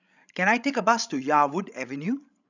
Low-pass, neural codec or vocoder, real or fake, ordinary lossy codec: 7.2 kHz; codec, 16 kHz, 16 kbps, FreqCodec, larger model; fake; none